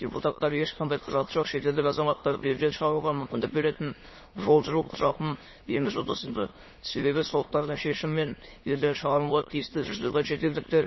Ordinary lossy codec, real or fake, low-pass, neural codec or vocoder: MP3, 24 kbps; fake; 7.2 kHz; autoencoder, 22.05 kHz, a latent of 192 numbers a frame, VITS, trained on many speakers